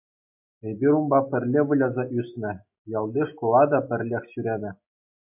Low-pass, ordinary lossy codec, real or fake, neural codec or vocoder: 3.6 kHz; MP3, 32 kbps; real; none